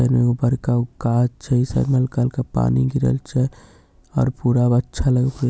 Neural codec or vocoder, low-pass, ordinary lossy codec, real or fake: none; none; none; real